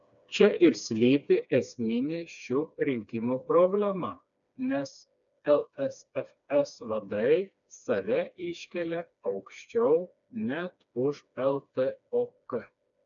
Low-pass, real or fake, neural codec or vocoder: 7.2 kHz; fake; codec, 16 kHz, 2 kbps, FreqCodec, smaller model